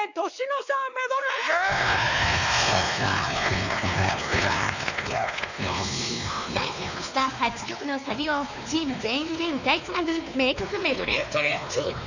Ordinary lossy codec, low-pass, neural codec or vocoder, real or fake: none; 7.2 kHz; codec, 16 kHz, 2 kbps, X-Codec, WavLM features, trained on Multilingual LibriSpeech; fake